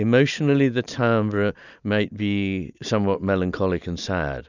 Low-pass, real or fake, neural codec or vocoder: 7.2 kHz; real; none